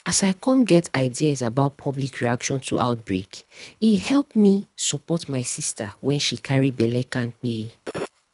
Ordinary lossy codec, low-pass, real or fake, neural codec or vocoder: none; 10.8 kHz; fake; codec, 24 kHz, 3 kbps, HILCodec